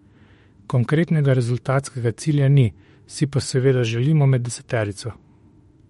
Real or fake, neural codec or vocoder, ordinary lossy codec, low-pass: fake; autoencoder, 48 kHz, 32 numbers a frame, DAC-VAE, trained on Japanese speech; MP3, 48 kbps; 19.8 kHz